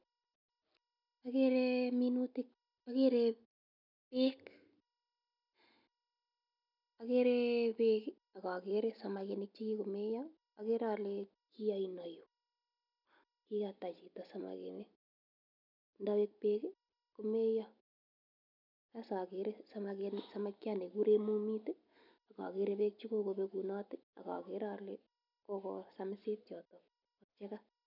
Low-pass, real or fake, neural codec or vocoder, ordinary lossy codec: 5.4 kHz; real; none; none